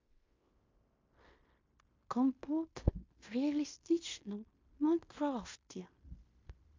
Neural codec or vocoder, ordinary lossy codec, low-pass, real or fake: codec, 16 kHz in and 24 kHz out, 0.9 kbps, LongCat-Audio-Codec, fine tuned four codebook decoder; MP3, 48 kbps; 7.2 kHz; fake